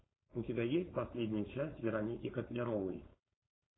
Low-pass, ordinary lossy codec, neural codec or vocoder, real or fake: 7.2 kHz; AAC, 16 kbps; codec, 16 kHz, 4.8 kbps, FACodec; fake